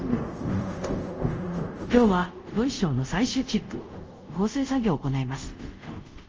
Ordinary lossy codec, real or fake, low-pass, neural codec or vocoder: Opus, 24 kbps; fake; 7.2 kHz; codec, 24 kHz, 0.5 kbps, DualCodec